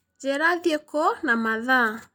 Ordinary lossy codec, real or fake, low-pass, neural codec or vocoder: none; real; none; none